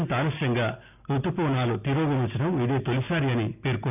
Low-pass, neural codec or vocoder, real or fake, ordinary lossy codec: 3.6 kHz; none; real; none